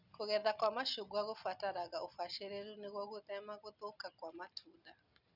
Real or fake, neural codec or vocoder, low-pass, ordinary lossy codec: real; none; 5.4 kHz; none